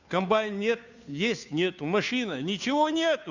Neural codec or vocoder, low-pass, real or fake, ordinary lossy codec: codec, 16 kHz, 2 kbps, FunCodec, trained on Chinese and English, 25 frames a second; 7.2 kHz; fake; none